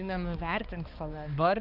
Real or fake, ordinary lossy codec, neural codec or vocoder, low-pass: fake; Opus, 24 kbps; codec, 16 kHz, 2 kbps, X-Codec, HuBERT features, trained on balanced general audio; 5.4 kHz